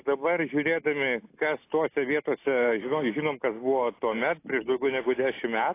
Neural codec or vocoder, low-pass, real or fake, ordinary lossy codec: none; 3.6 kHz; real; AAC, 24 kbps